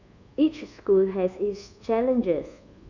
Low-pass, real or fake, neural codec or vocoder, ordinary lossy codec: 7.2 kHz; fake; codec, 24 kHz, 1.2 kbps, DualCodec; none